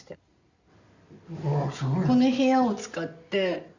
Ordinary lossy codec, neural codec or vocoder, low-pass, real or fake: Opus, 64 kbps; none; 7.2 kHz; real